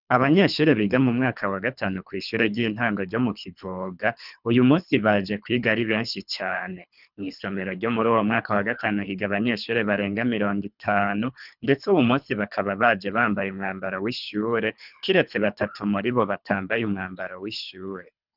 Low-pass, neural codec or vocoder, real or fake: 5.4 kHz; codec, 24 kHz, 3 kbps, HILCodec; fake